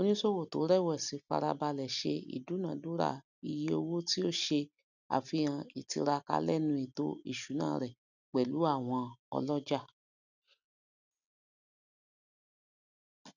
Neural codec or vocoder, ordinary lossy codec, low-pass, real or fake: none; none; 7.2 kHz; real